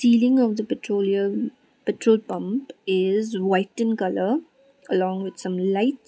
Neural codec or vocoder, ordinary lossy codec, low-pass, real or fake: none; none; none; real